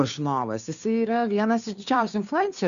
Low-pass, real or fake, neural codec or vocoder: 7.2 kHz; fake; codec, 16 kHz, 2 kbps, FunCodec, trained on Chinese and English, 25 frames a second